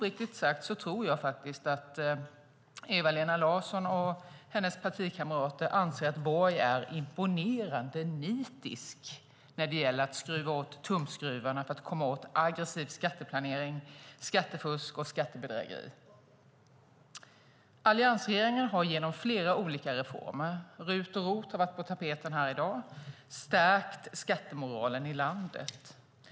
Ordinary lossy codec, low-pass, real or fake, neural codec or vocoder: none; none; real; none